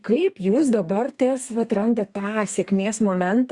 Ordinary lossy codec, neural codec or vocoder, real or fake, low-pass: Opus, 64 kbps; codec, 32 kHz, 1.9 kbps, SNAC; fake; 10.8 kHz